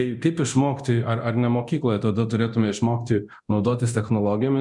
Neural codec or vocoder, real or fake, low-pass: codec, 24 kHz, 0.9 kbps, DualCodec; fake; 10.8 kHz